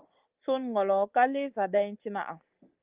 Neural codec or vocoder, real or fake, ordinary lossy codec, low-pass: none; real; Opus, 24 kbps; 3.6 kHz